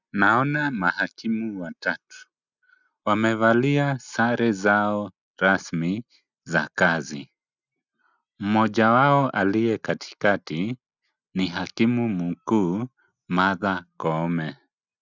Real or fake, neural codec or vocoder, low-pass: real; none; 7.2 kHz